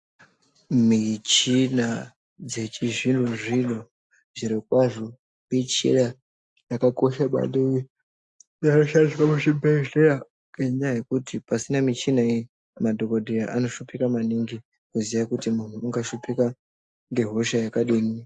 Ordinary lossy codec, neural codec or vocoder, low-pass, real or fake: AAC, 64 kbps; none; 10.8 kHz; real